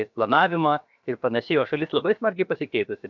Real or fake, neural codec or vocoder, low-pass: fake; codec, 16 kHz, about 1 kbps, DyCAST, with the encoder's durations; 7.2 kHz